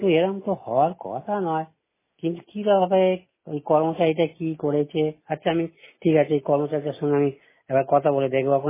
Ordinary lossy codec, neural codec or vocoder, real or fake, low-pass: MP3, 16 kbps; none; real; 3.6 kHz